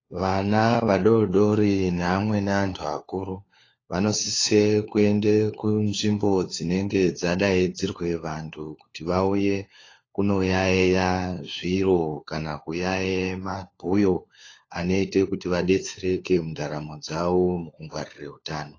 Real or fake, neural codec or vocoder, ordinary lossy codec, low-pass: fake; codec, 16 kHz, 4 kbps, FunCodec, trained on LibriTTS, 50 frames a second; AAC, 32 kbps; 7.2 kHz